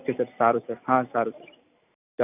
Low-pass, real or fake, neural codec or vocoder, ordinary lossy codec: 3.6 kHz; real; none; none